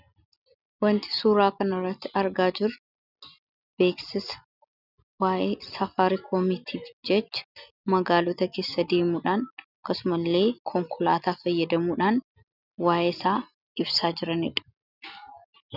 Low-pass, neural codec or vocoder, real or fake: 5.4 kHz; none; real